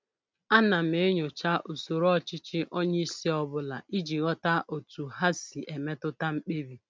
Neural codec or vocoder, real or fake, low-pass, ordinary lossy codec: none; real; none; none